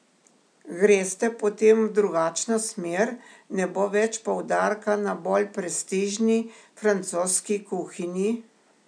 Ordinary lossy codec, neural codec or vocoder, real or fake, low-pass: none; none; real; 9.9 kHz